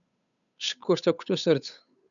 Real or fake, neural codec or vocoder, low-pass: fake; codec, 16 kHz, 8 kbps, FunCodec, trained on Chinese and English, 25 frames a second; 7.2 kHz